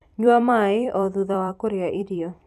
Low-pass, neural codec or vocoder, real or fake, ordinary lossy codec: 14.4 kHz; vocoder, 44.1 kHz, 128 mel bands every 256 samples, BigVGAN v2; fake; none